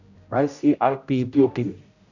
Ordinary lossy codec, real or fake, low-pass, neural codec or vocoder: none; fake; 7.2 kHz; codec, 16 kHz, 0.5 kbps, X-Codec, HuBERT features, trained on general audio